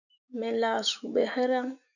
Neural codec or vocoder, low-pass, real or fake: autoencoder, 48 kHz, 128 numbers a frame, DAC-VAE, trained on Japanese speech; 7.2 kHz; fake